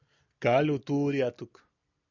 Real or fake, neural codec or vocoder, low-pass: real; none; 7.2 kHz